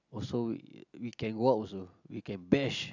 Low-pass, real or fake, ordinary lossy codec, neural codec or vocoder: 7.2 kHz; real; none; none